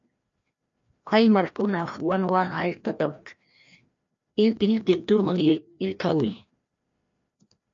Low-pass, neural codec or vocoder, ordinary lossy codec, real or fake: 7.2 kHz; codec, 16 kHz, 1 kbps, FreqCodec, larger model; MP3, 48 kbps; fake